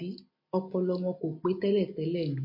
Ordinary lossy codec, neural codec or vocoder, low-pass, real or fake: MP3, 32 kbps; none; 5.4 kHz; real